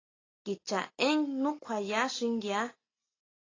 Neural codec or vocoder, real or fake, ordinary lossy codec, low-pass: none; real; AAC, 32 kbps; 7.2 kHz